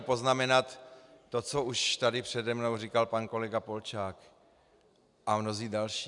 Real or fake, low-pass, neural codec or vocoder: real; 10.8 kHz; none